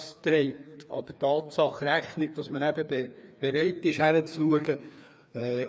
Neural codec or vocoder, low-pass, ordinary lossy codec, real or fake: codec, 16 kHz, 2 kbps, FreqCodec, larger model; none; none; fake